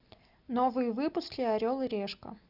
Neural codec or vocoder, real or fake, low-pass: none; real; 5.4 kHz